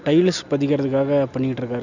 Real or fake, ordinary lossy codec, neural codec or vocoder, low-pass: real; none; none; 7.2 kHz